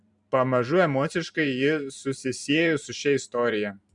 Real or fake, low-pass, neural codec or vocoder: fake; 10.8 kHz; vocoder, 44.1 kHz, 128 mel bands every 512 samples, BigVGAN v2